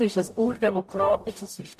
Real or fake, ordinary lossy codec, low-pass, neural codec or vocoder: fake; MP3, 64 kbps; 14.4 kHz; codec, 44.1 kHz, 0.9 kbps, DAC